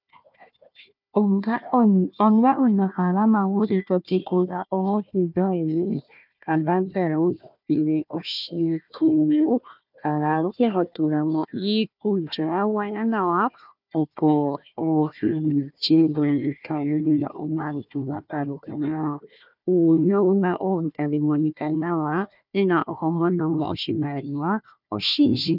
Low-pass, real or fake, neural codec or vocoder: 5.4 kHz; fake; codec, 16 kHz, 1 kbps, FunCodec, trained on Chinese and English, 50 frames a second